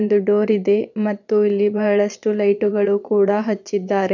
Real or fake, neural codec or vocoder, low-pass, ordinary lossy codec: fake; vocoder, 22.05 kHz, 80 mel bands, Vocos; 7.2 kHz; none